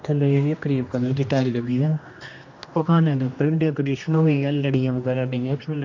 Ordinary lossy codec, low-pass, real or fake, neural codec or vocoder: MP3, 48 kbps; 7.2 kHz; fake; codec, 16 kHz, 1 kbps, X-Codec, HuBERT features, trained on general audio